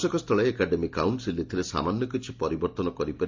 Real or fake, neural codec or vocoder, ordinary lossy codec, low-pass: real; none; MP3, 64 kbps; 7.2 kHz